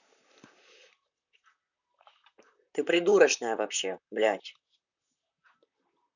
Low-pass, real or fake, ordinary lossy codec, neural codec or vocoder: 7.2 kHz; fake; none; vocoder, 44.1 kHz, 128 mel bands, Pupu-Vocoder